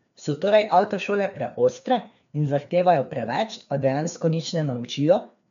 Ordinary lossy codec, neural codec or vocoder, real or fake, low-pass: none; codec, 16 kHz, 2 kbps, FreqCodec, larger model; fake; 7.2 kHz